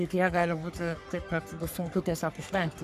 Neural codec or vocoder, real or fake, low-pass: codec, 44.1 kHz, 3.4 kbps, Pupu-Codec; fake; 14.4 kHz